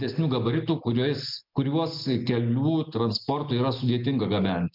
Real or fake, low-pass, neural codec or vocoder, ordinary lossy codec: real; 5.4 kHz; none; MP3, 48 kbps